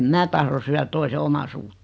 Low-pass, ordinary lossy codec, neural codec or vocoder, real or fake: none; none; none; real